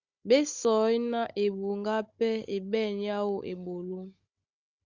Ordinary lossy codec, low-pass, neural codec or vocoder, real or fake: Opus, 64 kbps; 7.2 kHz; codec, 16 kHz, 16 kbps, FunCodec, trained on Chinese and English, 50 frames a second; fake